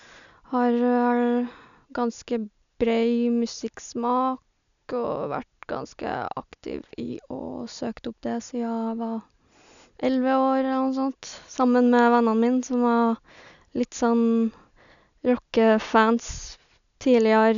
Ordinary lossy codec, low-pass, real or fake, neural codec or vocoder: none; 7.2 kHz; real; none